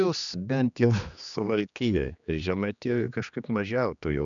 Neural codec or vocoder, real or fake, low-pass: codec, 16 kHz, 2 kbps, X-Codec, HuBERT features, trained on general audio; fake; 7.2 kHz